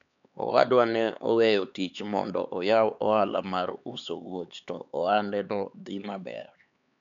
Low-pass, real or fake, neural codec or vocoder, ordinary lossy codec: 7.2 kHz; fake; codec, 16 kHz, 4 kbps, X-Codec, HuBERT features, trained on LibriSpeech; none